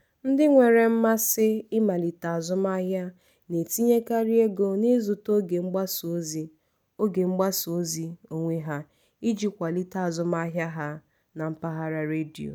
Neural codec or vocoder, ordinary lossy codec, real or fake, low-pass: none; none; real; none